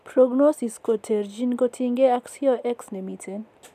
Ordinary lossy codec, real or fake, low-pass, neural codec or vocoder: none; real; 14.4 kHz; none